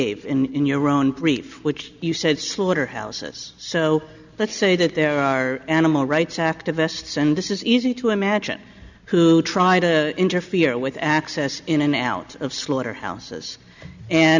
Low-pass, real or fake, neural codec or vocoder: 7.2 kHz; real; none